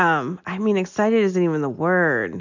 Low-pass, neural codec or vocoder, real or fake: 7.2 kHz; none; real